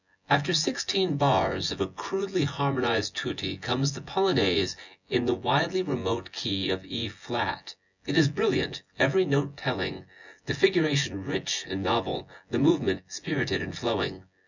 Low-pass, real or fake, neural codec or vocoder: 7.2 kHz; fake; vocoder, 24 kHz, 100 mel bands, Vocos